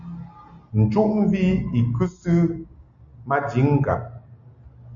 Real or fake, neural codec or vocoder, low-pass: real; none; 7.2 kHz